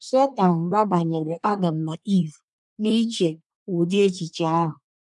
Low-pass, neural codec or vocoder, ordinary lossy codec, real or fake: 10.8 kHz; codec, 24 kHz, 1 kbps, SNAC; none; fake